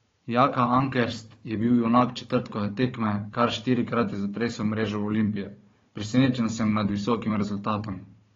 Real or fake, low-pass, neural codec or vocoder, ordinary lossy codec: fake; 7.2 kHz; codec, 16 kHz, 4 kbps, FunCodec, trained on Chinese and English, 50 frames a second; AAC, 32 kbps